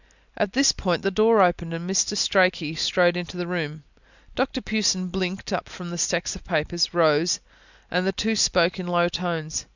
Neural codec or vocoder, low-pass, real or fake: none; 7.2 kHz; real